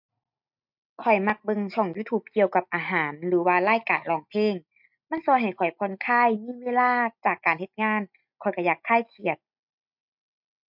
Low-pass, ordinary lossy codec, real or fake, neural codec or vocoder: 5.4 kHz; MP3, 48 kbps; real; none